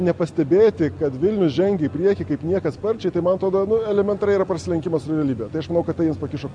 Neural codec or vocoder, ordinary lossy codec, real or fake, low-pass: none; Opus, 64 kbps; real; 9.9 kHz